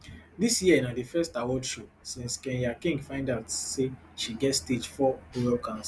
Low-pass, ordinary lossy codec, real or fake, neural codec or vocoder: none; none; real; none